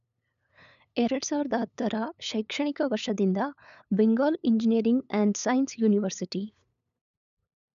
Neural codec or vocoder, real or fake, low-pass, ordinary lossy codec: codec, 16 kHz, 8 kbps, FunCodec, trained on LibriTTS, 25 frames a second; fake; 7.2 kHz; none